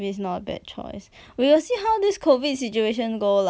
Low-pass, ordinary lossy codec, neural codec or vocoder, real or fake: none; none; none; real